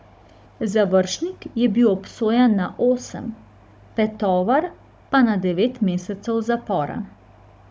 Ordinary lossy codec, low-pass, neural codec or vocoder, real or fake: none; none; codec, 16 kHz, 16 kbps, FunCodec, trained on Chinese and English, 50 frames a second; fake